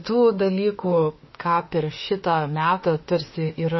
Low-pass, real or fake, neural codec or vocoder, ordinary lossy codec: 7.2 kHz; fake; autoencoder, 48 kHz, 32 numbers a frame, DAC-VAE, trained on Japanese speech; MP3, 24 kbps